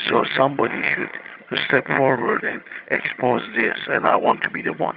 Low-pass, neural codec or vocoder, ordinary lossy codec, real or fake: 5.4 kHz; vocoder, 22.05 kHz, 80 mel bands, HiFi-GAN; Opus, 64 kbps; fake